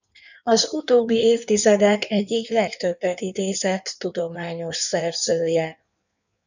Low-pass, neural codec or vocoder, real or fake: 7.2 kHz; codec, 16 kHz in and 24 kHz out, 1.1 kbps, FireRedTTS-2 codec; fake